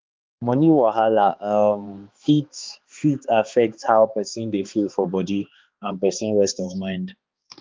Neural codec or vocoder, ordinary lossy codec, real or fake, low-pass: codec, 16 kHz, 2 kbps, X-Codec, HuBERT features, trained on balanced general audio; Opus, 32 kbps; fake; 7.2 kHz